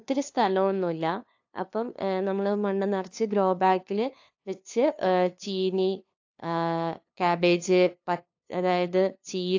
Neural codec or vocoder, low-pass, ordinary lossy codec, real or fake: codec, 16 kHz, 2 kbps, FunCodec, trained on LibriTTS, 25 frames a second; 7.2 kHz; AAC, 48 kbps; fake